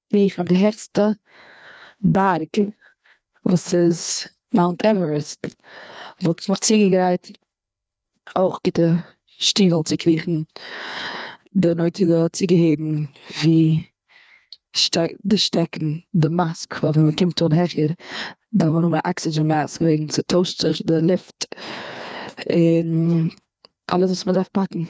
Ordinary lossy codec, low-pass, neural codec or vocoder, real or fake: none; none; codec, 16 kHz, 2 kbps, FreqCodec, larger model; fake